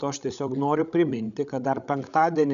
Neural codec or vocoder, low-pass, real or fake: codec, 16 kHz, 16 kbps, FreqCodec, larger model; 7.2 kHz; fake